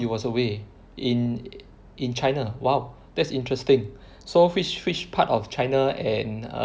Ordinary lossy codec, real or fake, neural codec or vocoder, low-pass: none; real; none; none